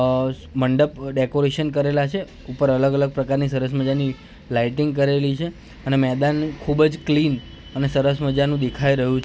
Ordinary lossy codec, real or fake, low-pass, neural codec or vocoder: none; real; none; none